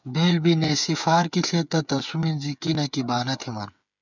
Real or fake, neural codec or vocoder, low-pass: fake; codec, 16 kHz, 8 kbps, FreqCodec, smaller model; 7.2 kHz